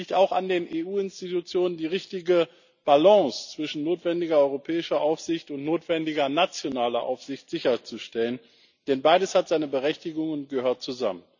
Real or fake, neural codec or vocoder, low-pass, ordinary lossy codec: real; none; 7.2 kHz; none